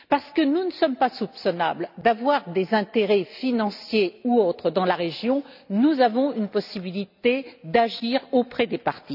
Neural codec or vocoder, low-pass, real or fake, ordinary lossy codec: none; 5.4 kHz; real; none